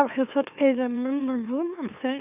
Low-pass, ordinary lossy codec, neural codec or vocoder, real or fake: 3.6 kHz; none; autoencoder, 44.1 kHz, a latent of 192 numbers a frame, MeloTTS; fake